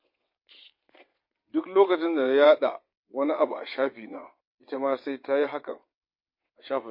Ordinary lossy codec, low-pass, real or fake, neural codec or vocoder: MP3, 32 kbps; 5.4 kHz; fake; vocoder, 22.05 kHz, 80 mel bands, WaveNeXt